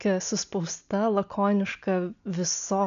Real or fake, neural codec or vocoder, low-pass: real; none; 7.2 kHz